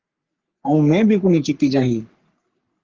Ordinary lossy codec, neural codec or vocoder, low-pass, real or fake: Opus, 24 kbps; codec, 44.1 kHz, 3.4 kbps, Pupu-Codec; 7.2 kHz; fake